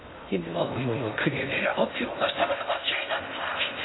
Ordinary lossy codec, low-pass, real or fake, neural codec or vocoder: AAC, 16 kbps; 7.2 kHz; fake; codec, 16 kHz in and 24 kHz out, 0.6 kbps, FocalCodec, streaming, 2048 codes